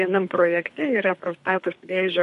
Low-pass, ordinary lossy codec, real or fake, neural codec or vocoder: 10.8 kHz; MP3, 64 kbps; fake; codec, 24 kHz, 3 kbps, HILCodec